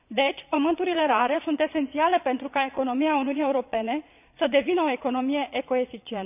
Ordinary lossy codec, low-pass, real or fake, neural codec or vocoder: none; 3.6 kHz; fake; vocoder, 22.05 kHz, 80 mel bands, Vocos